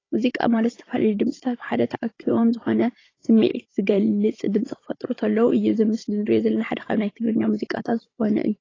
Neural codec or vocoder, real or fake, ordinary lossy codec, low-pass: codec, 16 kHz, 16 kbps, FunCodec, trained on Chinese and English, 50 frames a second; fake; AAC, 32 kbps; 7.2 kHz